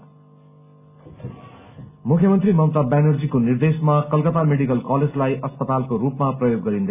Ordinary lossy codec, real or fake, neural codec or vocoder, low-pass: AAC, 32 kbps; real; none; 3.6 kHz